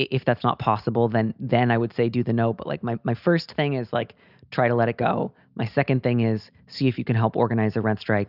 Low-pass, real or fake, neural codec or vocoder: 5.4 kHz; real; none